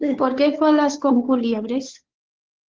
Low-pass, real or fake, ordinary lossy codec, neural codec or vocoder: 7.2 kHz; fake; Opus, 16 kbps; codec, 16 kHz, 2 kbps, FunCodec, trained on Chinese and English, 25 frames a second